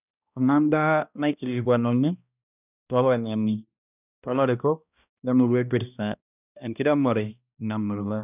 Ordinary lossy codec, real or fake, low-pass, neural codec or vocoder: none; fake; 3.6 kHz; codec, 16 kHz, 1 kbps, X-Codec, HuBERT features, trained on balanced general audio